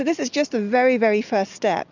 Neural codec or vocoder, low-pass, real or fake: none; 7.2 kHz; real